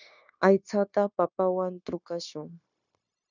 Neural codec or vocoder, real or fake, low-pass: codec, 16 kHz, 0.9 kbps, LongCat-Audio-Codec; fake; 7.2 kHz